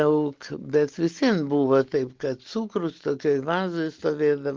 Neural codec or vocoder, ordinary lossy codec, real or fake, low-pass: none; Opus, 16 kbps; real; 7.2 kHz